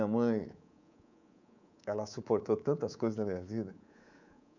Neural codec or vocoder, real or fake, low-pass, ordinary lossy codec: codec, 24 kHz, 3.1 kbps, DualCodec; fake; 7.2 kHz; none